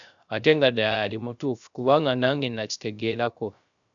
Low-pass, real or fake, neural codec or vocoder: 7.2 kHz; fake; codec, 16 kHz, 0.3 kbps, FocalCodec